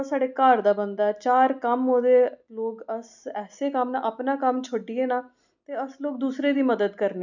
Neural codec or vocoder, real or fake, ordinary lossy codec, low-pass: none; real; none; 7.2 kHz